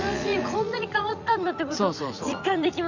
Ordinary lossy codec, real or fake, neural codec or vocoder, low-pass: none; fake; vocoder, 44.1 kHz, 80 mel bands, Vocos; 7.2 kHz